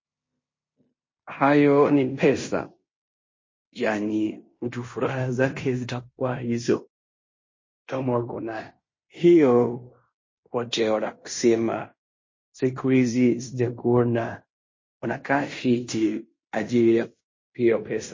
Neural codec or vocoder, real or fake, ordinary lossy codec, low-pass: codec, 16 kHz in and 24 kHz out, 0.9 kbps, LongCat-Audio-Codec, fine tuned four codebook decoder; fake; MP3, 32 kbps; 7.2 kHz